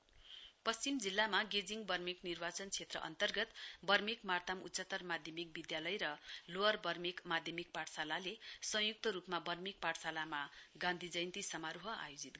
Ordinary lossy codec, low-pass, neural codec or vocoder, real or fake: none; none; none; real